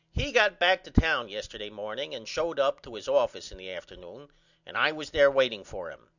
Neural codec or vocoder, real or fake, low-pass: none; real; 7.2 kHz